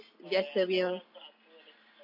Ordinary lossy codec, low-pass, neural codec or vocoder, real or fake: MP3, 32 kbps; 5.4 kHz; none; real